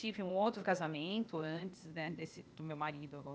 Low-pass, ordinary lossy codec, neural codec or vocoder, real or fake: none; none; codec, 16 kHz, 0.8 kbps, ZipCodec; fake